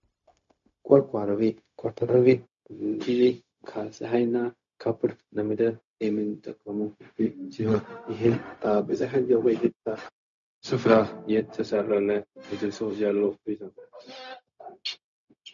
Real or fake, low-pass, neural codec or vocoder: fake; 7.2 kHz; codec, 16 kHz, 0.4 kbps, LongCat-Audio-Codec